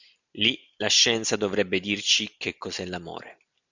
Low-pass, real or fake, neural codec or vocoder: 7.2 kHz; real; none